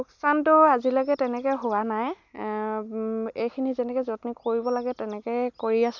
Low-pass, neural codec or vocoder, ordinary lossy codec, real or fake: 7.2 kHz; none; none; real